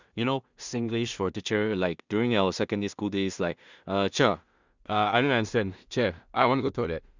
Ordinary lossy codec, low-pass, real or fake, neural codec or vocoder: Opus, 64 kbps; 7.2 kHz; fake; codec, 16 kHz in and 24 kHz out, 0.4 kbps, LongCat-Audio-Codec, two codebook decoder